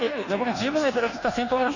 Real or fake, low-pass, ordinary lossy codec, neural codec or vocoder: fake; 7.2 kHz; none; codec, 24 kHz, 1.2 kbps, DualCodec